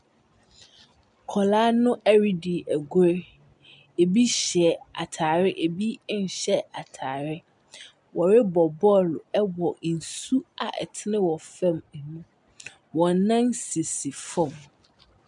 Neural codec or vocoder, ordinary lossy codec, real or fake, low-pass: none; MP3, 96 kbps; real; 10.8 kHz